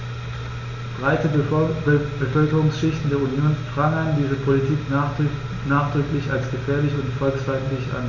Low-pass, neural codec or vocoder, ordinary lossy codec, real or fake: 7.2 kHz; none; none; real